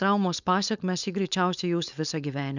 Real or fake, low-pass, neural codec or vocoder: fake; 7.2 kHz; codec, 16 kHz, 4.8 kbps, FACodec